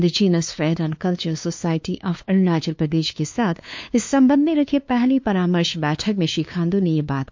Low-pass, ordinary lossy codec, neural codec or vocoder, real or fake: 7.2 kHz; MP3, 48 kbps; codec, 16 kHz, 2 kbps, FunCodec, trained on LibriTTS, 25 frames a second; fake